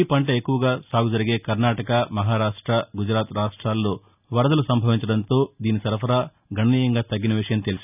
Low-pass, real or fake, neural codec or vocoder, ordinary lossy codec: 3.6 kHz; real; none; none